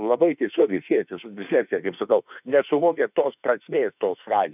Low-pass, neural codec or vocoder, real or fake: 3.6 kHz; autoencoder, 48 kHz, 32 numbers a frame, DAC-VAE, trained on Japanese speech; fake